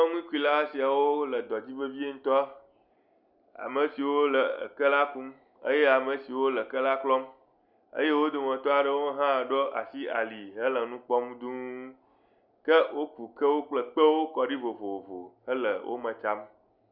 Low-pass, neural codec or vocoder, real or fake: 5.4 kHz; none; real